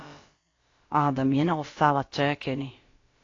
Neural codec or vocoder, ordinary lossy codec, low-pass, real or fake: codec, 16 kHz, about 1 kbps, DyCAST, with the encoder's durations; AAC, 32 kbps; 7.2 kHz; fake